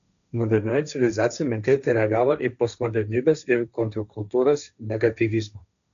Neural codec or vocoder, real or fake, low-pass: codec, 16 kHz, 1.1 kbps, Voila-Tokenizer; fake; 7.2 kHz